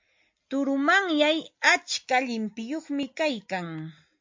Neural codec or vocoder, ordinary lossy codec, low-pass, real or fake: none; MP3, 48 kbps; 7.2 kHz; real